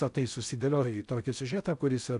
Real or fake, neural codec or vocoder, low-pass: fake; codec, 16 kHz in and 24 kHz out, 0.6 kbps, FocalCodec, streaming, 4096 codes; 10.8 kHz